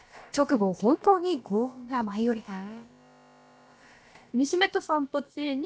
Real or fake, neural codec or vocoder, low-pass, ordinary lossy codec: fake; codec, 16 kHz, about 1 kbps, DyCAST, with the encoder's durations; none; none